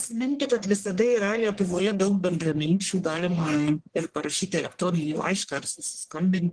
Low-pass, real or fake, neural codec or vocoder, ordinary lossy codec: 9.9 kHz; fake; codec, 44.1 kHz, 1.7 kbps, Pupu-Codec; Opus, 16 kbps